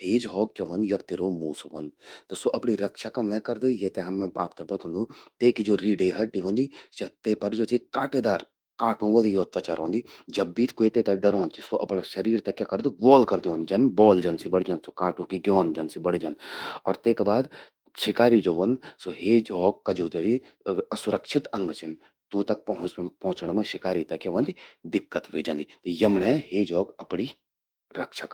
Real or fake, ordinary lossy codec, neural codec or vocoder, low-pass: fake; Opus, 32 kbps; autoencoder, 48 kHz, 32 numbers a frame, DAC-VAE, trained on Japanese speech; 19.8 kHz